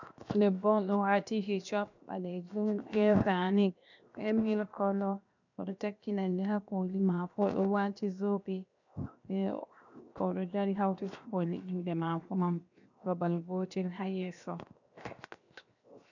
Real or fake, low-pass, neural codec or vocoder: fake; 7.2 kHz; codec, 16 kHz, 0.7 kbps, FocalCodec